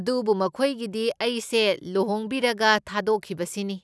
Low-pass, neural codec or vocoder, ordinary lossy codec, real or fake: none; none; none; real